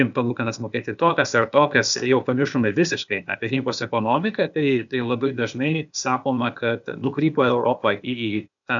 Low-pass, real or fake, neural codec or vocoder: 7.2 kHz; fake; codec, 16 kHz, 0.8 kbps, ZipCodec